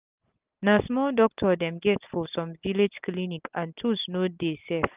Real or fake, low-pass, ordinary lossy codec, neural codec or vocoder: real; 3.6 kHz; Opus, 32 kbps; none